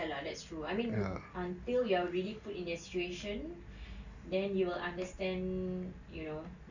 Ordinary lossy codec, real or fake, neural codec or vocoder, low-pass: none; real; none; 7.2 kHz